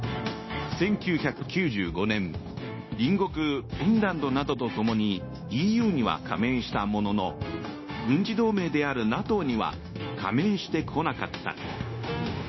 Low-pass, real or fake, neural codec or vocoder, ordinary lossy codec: 7.2 kHz; fake; codec, 16 kHz, 0.9 kbps, LongCat-Audio-Codec; MP3, 24 kbps